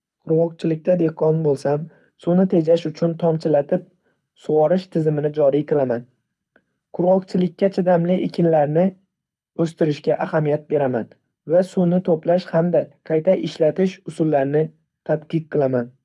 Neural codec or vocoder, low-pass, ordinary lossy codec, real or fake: codec, 24 kHz, 6 kbps, HILCodec; none; none; fake